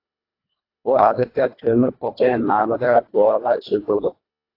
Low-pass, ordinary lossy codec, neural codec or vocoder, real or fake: 5.4 kHz; AAC, 32 kbps; codec, 24 kHz, 1.5 kbps, HILCodec; fake